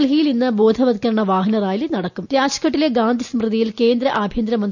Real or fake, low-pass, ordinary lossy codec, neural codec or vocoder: real; 7.2 kHz; none; none